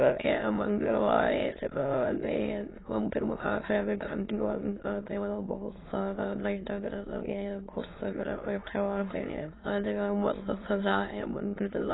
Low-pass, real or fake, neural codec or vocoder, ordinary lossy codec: 7.2 kHz; fake; autoencoder, 22.05 kHz, a latent of 192 numbers a frame, VITS, trained on many speakers; AAC, 16 kbps